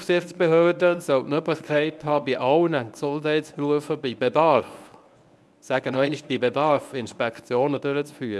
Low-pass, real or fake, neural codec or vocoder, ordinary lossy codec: none; fake; codec, 24 kHz, 0.9 kbps, WavTokenizer, medium speech release version 1; none